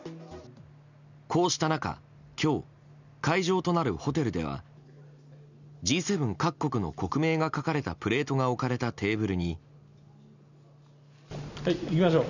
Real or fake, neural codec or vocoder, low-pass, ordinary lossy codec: real; none; 7.2 kHz; none